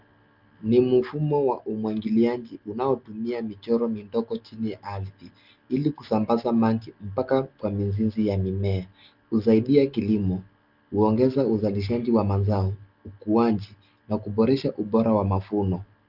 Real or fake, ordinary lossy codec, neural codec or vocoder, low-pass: real; Opus, 32 kbps; none; 5.4 kHz